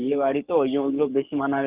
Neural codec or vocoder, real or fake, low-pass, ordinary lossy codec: codec, 44.1 kHz, 7.8 kbps, Pupu-Codec; fake; 3.6 kHz; Opus, 24 kbps